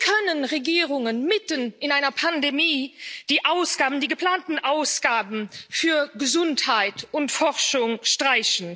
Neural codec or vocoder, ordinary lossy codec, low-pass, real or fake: none; none; none; real